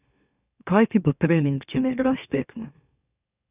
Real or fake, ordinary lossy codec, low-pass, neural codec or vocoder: fake; none; 3.6 kHz; autoencoder, 44.1 kHz, a latent of 192 numbers a frame, MeloTTS